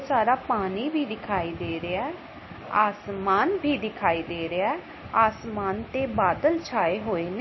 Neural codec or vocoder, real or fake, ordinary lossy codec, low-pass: none; real; MP3, 24 kbps; 7.2 kHz